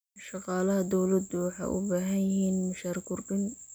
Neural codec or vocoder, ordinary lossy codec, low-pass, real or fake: none; none; none; real